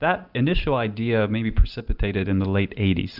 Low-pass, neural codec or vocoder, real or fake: 5.4 kHz; none; real